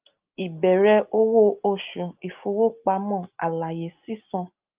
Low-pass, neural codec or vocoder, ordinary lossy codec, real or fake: 3.6 kHz; none; Opus, 24 kbps; real